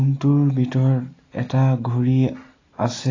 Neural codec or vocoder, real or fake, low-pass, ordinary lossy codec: none; real; 7.2 kHz; AAC, 32 kbps